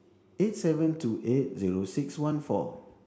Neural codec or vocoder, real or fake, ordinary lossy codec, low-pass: none; real; none; none